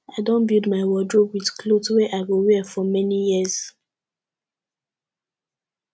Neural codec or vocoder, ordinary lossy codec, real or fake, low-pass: none; none; real; none